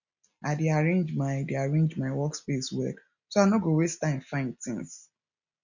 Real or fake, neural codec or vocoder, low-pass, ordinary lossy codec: real; none; 7.2 kHz; none